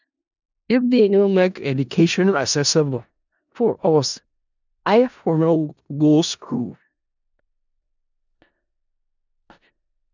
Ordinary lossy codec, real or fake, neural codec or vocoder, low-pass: none; fake; codec, 16 kHz in and 24 kHz out, 0.4 kbps, LongCat-Audio-Codec, four codebook decoder; 7.2 kHz